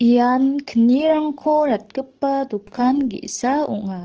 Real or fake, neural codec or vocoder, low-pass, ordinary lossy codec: fake; codec, 44.1 kHz, 7.8 kbps, DAC; 7.2 kHz; Opus, 16 kbps